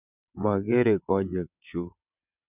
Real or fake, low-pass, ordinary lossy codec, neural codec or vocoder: fake; 3.6 kHz; none; vocoder, 22.05 kHz, 80 mel bands, WaveNeXt